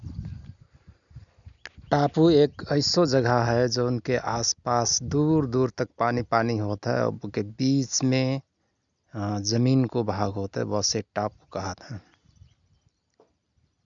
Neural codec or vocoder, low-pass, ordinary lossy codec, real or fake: none; 7.2 kHz; none; real